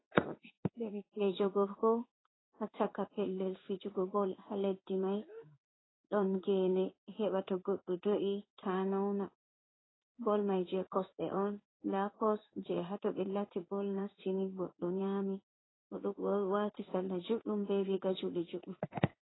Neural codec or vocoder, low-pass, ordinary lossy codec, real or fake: codec, 16 kHz in and 24 kHz out, 1 kbps, XY-Tokenizer; 7.2 kHz; AAC, 16 kbps; fake